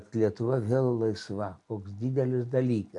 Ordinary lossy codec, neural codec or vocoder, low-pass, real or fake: MP3, 64 kbps; none; 10.8 kHz; real